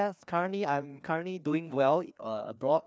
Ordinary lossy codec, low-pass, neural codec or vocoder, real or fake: none; none; codec, 16 kHz, 2 kbps, FreqCodec, larger model; fake